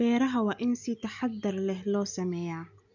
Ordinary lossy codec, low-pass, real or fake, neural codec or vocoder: none; 7.2 kHz; real; none